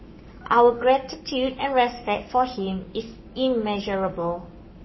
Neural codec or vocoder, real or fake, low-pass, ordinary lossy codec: codec, 44.1 kHz, 7.8 kbps, Pupu-Codec; fake; 7.2 kHz; MP3, 24 kbps